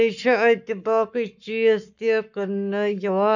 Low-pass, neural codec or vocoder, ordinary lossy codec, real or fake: 7.2 kHz; codec, 44.1 kHz, 7.8 kbps, Pupu-Codec; none; fake